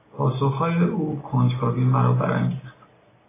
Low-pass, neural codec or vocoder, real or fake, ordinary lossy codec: 3.6 kHz; none; real; AAC, 16 kbps